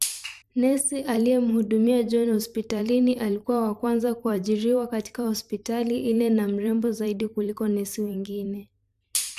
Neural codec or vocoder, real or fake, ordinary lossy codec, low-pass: vocoder, 44.1 kHz, 128 mel bands every 512 samples, BigVGAN v2; fake; AAC, 96 kbps; 14.4 kHz